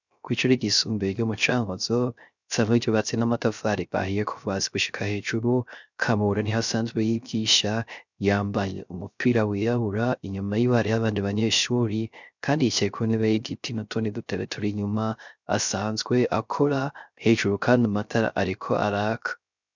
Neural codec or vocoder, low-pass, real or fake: codec, 16 kHz, 0.3 kbps, FocalCodec; 7.2 kHz; fake